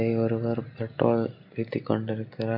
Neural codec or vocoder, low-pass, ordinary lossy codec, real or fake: none; 5.4 kHz; none; real